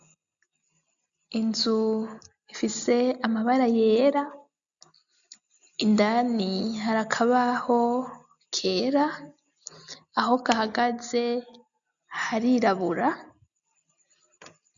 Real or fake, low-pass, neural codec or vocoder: real; 7.2 kHz; none